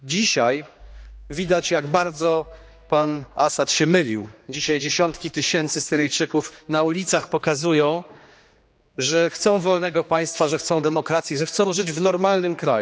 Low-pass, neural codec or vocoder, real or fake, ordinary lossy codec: none; codec, 16 kHz, 2 kbps, X-Codec, HuBERT features, trained on general audio; fake; none